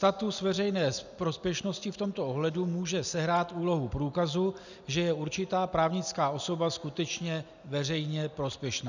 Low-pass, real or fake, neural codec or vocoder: 7.2 kHz; real; none